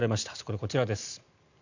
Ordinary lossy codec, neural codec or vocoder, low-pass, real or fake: none; none; 7.2 kHz; real